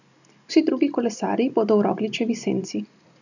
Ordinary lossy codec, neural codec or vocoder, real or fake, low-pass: none; none; real; 7.2 kHz